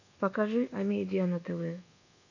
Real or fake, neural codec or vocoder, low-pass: fake; codec, 24 kHz, 1.2 kbps, DualCodec; 7.2 kHz